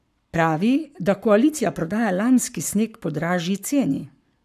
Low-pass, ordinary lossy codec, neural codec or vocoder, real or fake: 14.4 kHz; none; codec, 44.1 kHz, 7.8 kbps, Pupu-Codec; fake